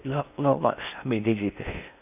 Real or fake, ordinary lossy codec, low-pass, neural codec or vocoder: fake; none; 3.6 kHz; codec, 16 kHz in and 24 kHz out, 0.6 kbps, FocalCodec, streaming, 4096 codes